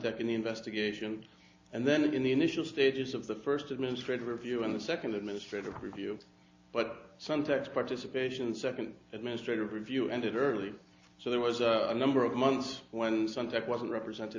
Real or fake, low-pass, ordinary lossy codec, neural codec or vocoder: real; 7.2 kHz; AAC, 48 kbps; none